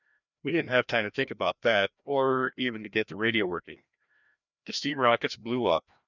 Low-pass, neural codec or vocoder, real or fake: 7.2 kHz; codec, 16 kHz, 1 kbps, FreqCodec, larger model; fake